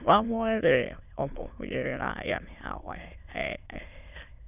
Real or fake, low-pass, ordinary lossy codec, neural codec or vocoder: fake; 3.6 kHz; none; autoencoder, 22.05 kHz, a latent of 192 numbers a frame, VITS, trained on many speakers